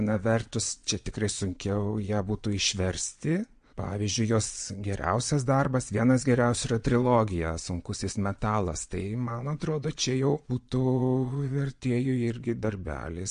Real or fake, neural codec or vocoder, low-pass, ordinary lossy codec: fake; vocoder, 22.05 kHz, 80 mel bands, Vocos; 9.9 kHz; MP3, 48 kbps